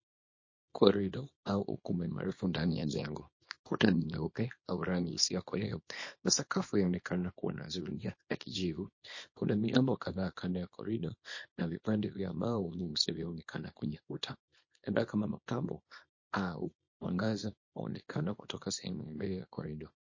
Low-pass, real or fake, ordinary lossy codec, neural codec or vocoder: 7.2 kHz; fake; MP3, 32 kbps; codec, 24 kHz, 0.9 kbps, WavTokenizer, small release